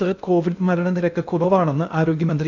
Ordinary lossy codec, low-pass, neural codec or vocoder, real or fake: none; 7.2 kHz; codec, 16 kHz in and 24 kHz out, 0.6 kbps, FocalCodec, streaming, 2048 codes; fake